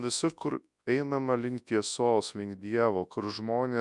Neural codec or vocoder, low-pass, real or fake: codec, 24 kHz, 0.9 kbps, WavTokenizer, large speech release; 10.8 kHz; fake